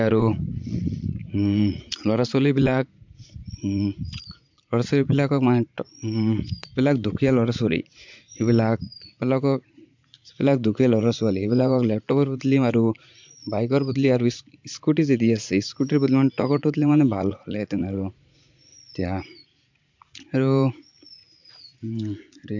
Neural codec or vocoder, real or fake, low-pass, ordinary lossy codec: vocoder, 44.1 kHz, 80 mel bands, Vocos; fake; 7.2 kHz; MP3, 64 kbps